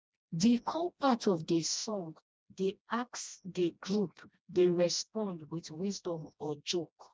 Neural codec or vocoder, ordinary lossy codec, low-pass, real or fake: codec, 16 kHz, 1 kbps, FreqCodec, smaller model; none; none; fake